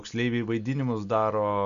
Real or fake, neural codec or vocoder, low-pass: real; none; 7.2 kHz